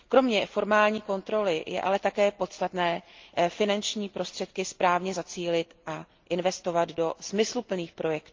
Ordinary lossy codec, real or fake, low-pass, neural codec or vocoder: Opus, 24 kbps; real; 7.2 kHz; none